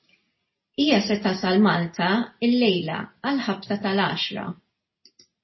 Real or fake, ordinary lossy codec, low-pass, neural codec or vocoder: real; MP3, 24 kbps; 7.2 kHz; none